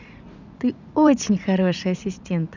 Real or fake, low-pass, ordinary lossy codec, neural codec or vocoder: fake; 7.2 kHz; none; vocoder, 44.1 kHz, 80 mel bands, Vocos